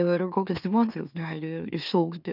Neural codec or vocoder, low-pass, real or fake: autoencoder, 44.1 kHz, a latent of 192 numbers a frame, MeloTTS; 5.4 kHz; fake